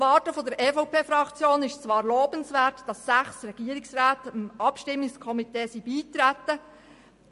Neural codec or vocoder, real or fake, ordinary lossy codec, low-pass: none; real; MP3, 48 kbps; 14.4 kHz